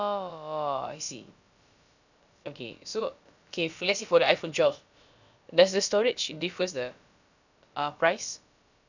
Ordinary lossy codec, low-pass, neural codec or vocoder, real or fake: none; 7.2 kHz; codec, 16 kHz, about 1 kbps, DyCAST, with the encoder's durations; fake